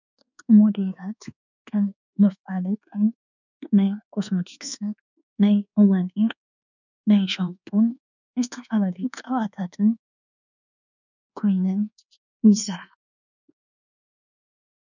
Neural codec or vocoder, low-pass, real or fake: codec, 24 kHz, 1.2 kbps, DualCodec; 7.2 kHz; fake